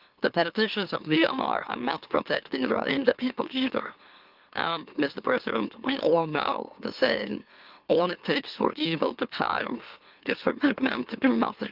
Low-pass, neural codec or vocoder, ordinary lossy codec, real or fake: 5.4 kHz; autoencoder, 44.1 kHz, a latent of 192 numbers a frame, MeloTTS; Opus, 24 kbps; fake